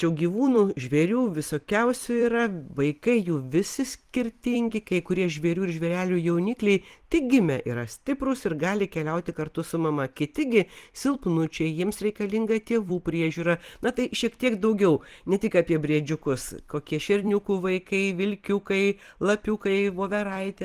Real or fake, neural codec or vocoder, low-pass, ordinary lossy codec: fake; vocoder, 44.1 kHz, 128 mel bands every 256 samples, BigVGAN v2; 14.4 kHz; Opus, 24 kbps